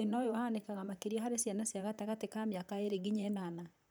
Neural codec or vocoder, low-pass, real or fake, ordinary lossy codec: vocoder, 44.1 kHz, 128 mel bands, Pupu-Vocoder; none; fake; none